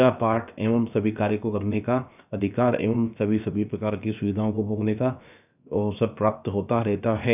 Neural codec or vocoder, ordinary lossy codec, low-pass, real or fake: codec, 16 kHz, about 1 kbps, DyCAST, with the encoder's durations; none; 3.6 kHz; fake